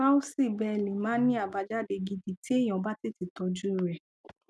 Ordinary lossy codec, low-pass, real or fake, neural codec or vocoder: none; none; real; none